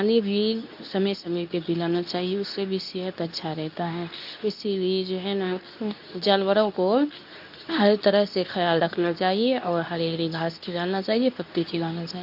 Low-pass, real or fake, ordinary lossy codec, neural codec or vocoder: 5.4 kHz; fake; AAC, 48 kbps; codec, 24 kHz, 0.9 kbps, WavTokenizer, medium speech release version 2